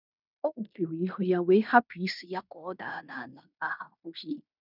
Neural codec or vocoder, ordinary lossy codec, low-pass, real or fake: codec, 16 kHz in and 24 kHz out, 0.9 kbps, LongCat-Audio-Codec, fine tuned four codebook decoder; none; 5.4 kHz; fake